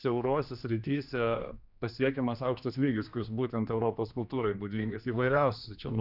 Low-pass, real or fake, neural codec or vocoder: 5.4 kHz; fake; codec, 16 kHz, 2 kbps, X-Codec, HuBERT features, trained on general audio